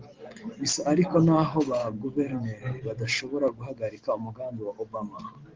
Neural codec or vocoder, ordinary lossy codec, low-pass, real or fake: none; Opus, 16 kbps; 7.2 kHz; real